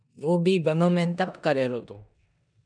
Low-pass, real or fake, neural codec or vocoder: 9.9 kHz; fake; codec, 16 kHz in and 24 kHz out, 0.9 kbps, LongCat-Audio-Codec, four codebook decoder